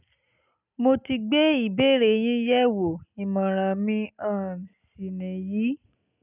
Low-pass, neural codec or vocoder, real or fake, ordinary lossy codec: 3.6 kHz; none; real; none